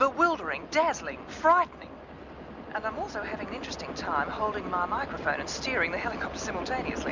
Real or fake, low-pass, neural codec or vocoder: real; 7.2 kHz; none